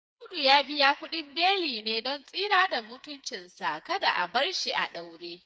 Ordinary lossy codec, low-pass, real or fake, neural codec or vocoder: none; none; fake; codec, 16 kHz, 4 kbps, FreqCodec, smaller model